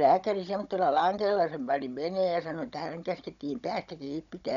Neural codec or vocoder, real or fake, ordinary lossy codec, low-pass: codec, 16 kHz, 16 kbps, FunCodec, trained on Chinese and English, 50 frames a second; fake; none; 7.2 kHz